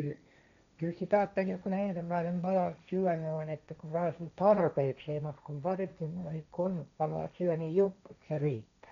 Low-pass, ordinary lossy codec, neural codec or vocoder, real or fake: 7.2 kHz; none; codec, 16 kHz, 1.1 kbps, Voila-Tokenizer; fake